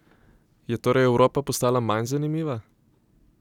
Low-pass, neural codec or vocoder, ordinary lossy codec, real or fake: 19.8 kHz; none; none; real